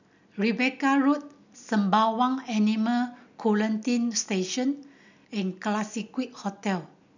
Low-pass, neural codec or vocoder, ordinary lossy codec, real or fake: 7.2 kHz; none; none; real